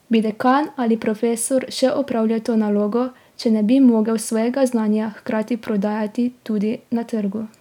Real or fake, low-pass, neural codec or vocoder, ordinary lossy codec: real; 19.8 kHz; none; none